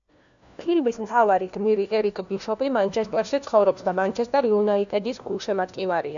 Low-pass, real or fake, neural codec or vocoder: 7.2 kHz; fake; codec, 16 kHz, 1 kbps, FunCodec, trained on LibriTTS, 50 frames a second